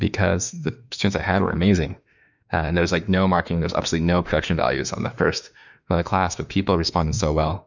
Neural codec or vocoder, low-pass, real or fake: autoencoder, 48 kHz, 32 numbers a frame, DAC-VAE, trained on Japanese speech; 7.2 kHz; fake